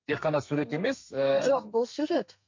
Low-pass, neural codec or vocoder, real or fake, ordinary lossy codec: 7.2 kHz; codec, 32 kHz, 1.9 kbps, SNAC; fake; MP3, 48 kbps